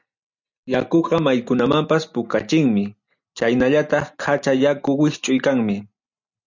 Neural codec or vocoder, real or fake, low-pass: none; real; 7.2 kHz